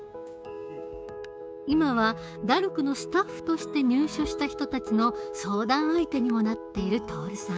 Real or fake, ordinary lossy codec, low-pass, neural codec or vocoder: fake; none; none; codec, 16 kHz, 6 kbps, DAC